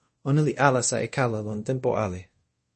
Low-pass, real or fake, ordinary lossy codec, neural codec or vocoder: 10.8 kHz; fake; MP3, 32 kbps; codec, 24 kHz, 0.5 kbps, DualCodec